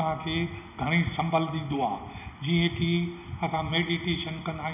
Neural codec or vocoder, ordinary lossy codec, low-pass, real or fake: none; none; 3.6 kHz; real